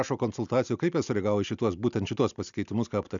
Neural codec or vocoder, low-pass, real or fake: none; 7.2 kHz; real